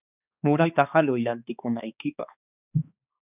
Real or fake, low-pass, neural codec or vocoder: fake; 3.6 kHz; codec, 16 kHz, 2 kbps, X-Codec, HuBERT features, trained on balanced general audio